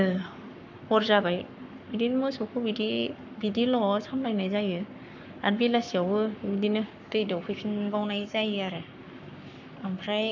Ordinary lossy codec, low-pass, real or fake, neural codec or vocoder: none; 7.2 kHz; fake; codec, 44.1 kHz, 7.8 kbps, Pupu-Codec